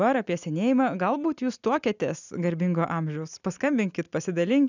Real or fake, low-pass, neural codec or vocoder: real; 7.2 kHz; none